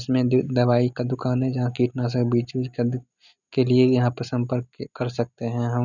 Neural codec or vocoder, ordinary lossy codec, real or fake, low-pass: none; none; real; 7.2 kHz